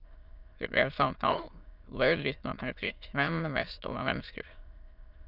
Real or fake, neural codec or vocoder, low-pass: fake; autoencoder, 22.05 kHz, a latent of 192 numbers a frame, VITS, trained on many speakers; 5.4 kHz